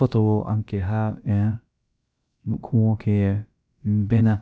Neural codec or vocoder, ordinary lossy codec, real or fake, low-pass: codec, 16 kHz, about 1 kbps, DyCAST, with the encoder's durations; none; fake; none